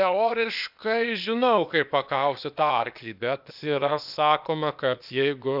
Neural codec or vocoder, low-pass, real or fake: codec, 16 kHz, 0.8 kbps, ZipCodec; 5.4 kHz; fake